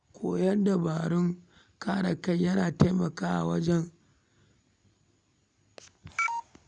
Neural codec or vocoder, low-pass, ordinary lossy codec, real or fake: none; 9.9 kHz; none; real